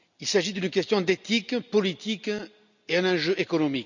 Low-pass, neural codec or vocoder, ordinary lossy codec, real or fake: 7.2 kHz; none; none; real